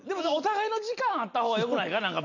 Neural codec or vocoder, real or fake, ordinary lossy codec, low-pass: none; real; none; 7.2 kHz